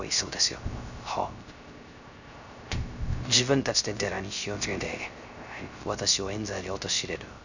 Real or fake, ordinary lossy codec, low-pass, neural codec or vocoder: fake; none; 7.2 kHz; codec, 16 kHz, 0.3 kbps, FocalCodec